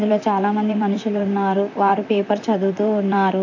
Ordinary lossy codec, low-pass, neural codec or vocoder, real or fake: AAC, 48 kbps; 7.2 kHz; vocoder, 44.1 kHz, 128 mel bands, Pupu-Vocoder; fake